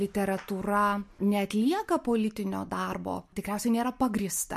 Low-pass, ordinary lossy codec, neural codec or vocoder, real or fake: 14.4 kHz; MP3, 64 kbps; none; real